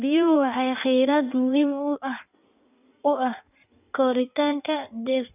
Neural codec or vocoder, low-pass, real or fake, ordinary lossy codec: codec, 16 kHz in and 24 kHz out, 1.1 kbps, FireRedTTS-2 codec; 3.6 kHz; fake; none